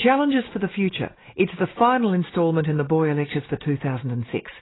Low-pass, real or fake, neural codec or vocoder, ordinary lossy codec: 7.2 kHz; real; none; AAC, 16 kbps